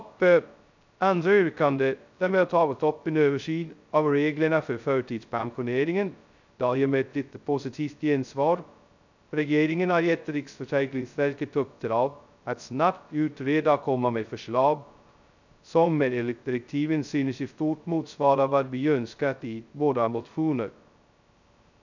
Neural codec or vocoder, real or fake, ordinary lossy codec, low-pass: codec, 16 kHz, 0.2 kbps, FocalCodec; fake; none; 7.2 kHz